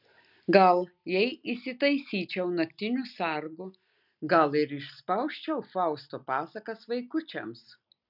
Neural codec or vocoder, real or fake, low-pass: none; real; 5.4 kHz